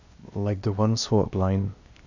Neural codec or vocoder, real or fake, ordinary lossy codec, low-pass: codec, 16 kHz, 0.8 kbps, ZipCodec; fake; none; 7.2 kHz